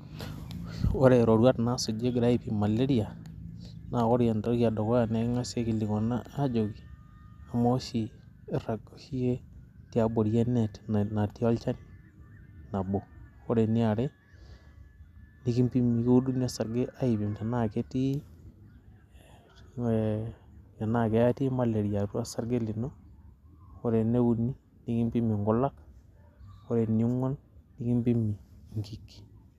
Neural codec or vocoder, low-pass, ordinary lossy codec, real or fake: none; 14.4 kHz; none; real